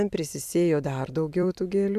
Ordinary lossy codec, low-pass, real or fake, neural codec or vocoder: AAC, 96 kbps; 14.4 kHz; fake; vocoder, 44.1 kHz, 128 mel bands every 256 samples, BigVGAN v2